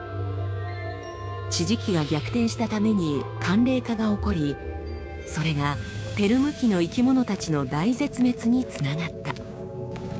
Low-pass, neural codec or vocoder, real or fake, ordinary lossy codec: none; codec, 16 kHz, 6 kbps, DAC; fake; none